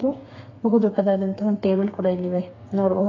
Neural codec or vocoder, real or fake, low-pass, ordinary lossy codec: codec, 32 kHz, 1.9 kbps, SNAC; fake; 7.2 kHz; AAC, 32 kbps